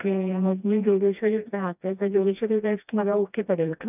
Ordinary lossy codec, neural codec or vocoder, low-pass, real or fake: none; codec, 16 kHz, 1 kbps, FreqCodec, smaller model; 3.6 kHz; fake